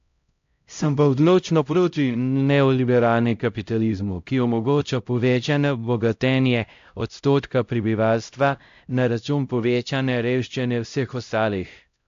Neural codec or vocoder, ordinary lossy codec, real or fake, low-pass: codec, 16 kHz, 0.5 kbps, X-Codec, HuBERT features, trained on LibriSpeech; AAC, 64 kbps; fake; 7.2 kHz